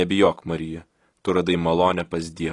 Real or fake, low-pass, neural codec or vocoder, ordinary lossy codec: real; 10.8 kHz; none; AAC, 32 kbps